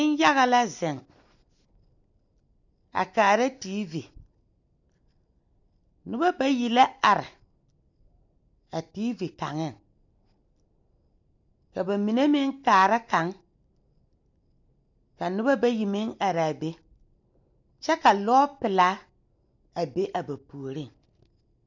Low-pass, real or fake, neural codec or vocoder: 7.2 kHz; real; none